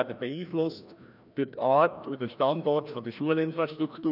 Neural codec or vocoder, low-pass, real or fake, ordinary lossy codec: codec, 16 kHz, 1 kbps, FreqCodec, larger model; 5.4 kHz; fake; none